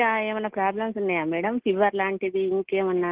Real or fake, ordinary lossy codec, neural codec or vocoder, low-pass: real; Opus, 16 kbps; none; 3.6 kHz